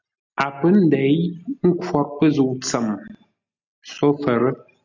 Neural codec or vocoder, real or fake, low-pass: none; real; 7.2 kHz